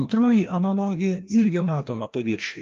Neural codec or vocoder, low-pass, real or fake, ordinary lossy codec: codec, 16 kHz, 1 kbps, FreqCodec, larger model; 7.2 kHz; fake; Opus, 32 kbps